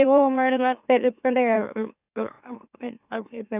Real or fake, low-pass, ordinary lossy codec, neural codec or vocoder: fake; 3.6 kHz; none; autoencoder, 44.1 kHz, a latent of 192 numbers a frame, MeloTTS